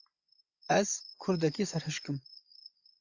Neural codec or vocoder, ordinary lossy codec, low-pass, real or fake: vocoder, 24 kHz, 100 mel bands, Vocos; AAC, 48 kbps; 7.2 kHz; fake